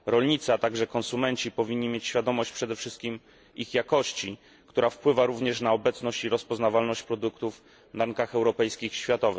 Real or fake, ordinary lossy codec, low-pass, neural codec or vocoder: real; none; none; none